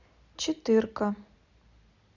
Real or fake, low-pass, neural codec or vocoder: real; 7.2 kHz; none